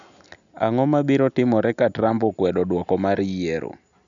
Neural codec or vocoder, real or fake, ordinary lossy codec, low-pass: none; real; none; 7.2 kHz